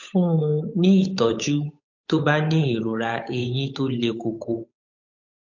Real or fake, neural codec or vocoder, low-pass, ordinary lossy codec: fake; codec, 16 kHz, 8 kbps, FunCodec, trained on Chinese and English, 25 frames a second; 7.2 kHz; MP3, 48 kbps